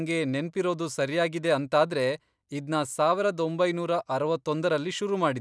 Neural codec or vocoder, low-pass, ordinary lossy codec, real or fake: none; none; none; real